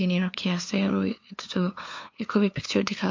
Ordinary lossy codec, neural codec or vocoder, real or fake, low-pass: MP3, 48 kbps; codec, 16 kHz, 2 kbps, FunCodec, trained on LibriTTS, 25 frames a second; fake; 7.2 kHz